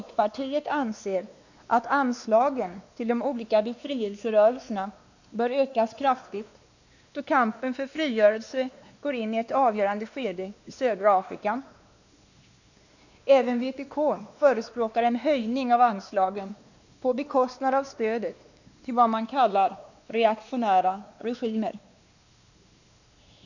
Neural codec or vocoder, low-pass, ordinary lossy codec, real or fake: codec, 16 kHz, 2 kbps, X-Codec, WavLM features, trained on Multilingual LibriSpeech; 7.2 kHz; none; fake